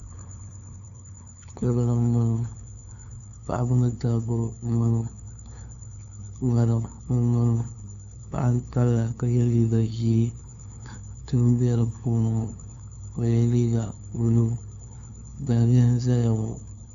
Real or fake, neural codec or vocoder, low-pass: fake; codec, 16 kHz, 2 kbps, FunCodec, trained on LibriTTS, 25 frames a second; 7.2 kHz